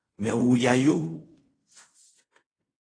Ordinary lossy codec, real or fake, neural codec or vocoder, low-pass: AAC, 32 kbps; fake; codec, 16 kHz in and 24 kHz out, 0.4 kbps, LongCat-Audio-Codec, fine tuned four codebook decoder; 9.9 kHz